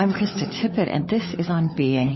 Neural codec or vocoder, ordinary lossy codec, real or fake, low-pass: codec, 16 kHz, 16 kbps, FunCodec, trained on LibriTTS, 50 frames a second; MP3, 24 kbps; fake; 7.2 kHz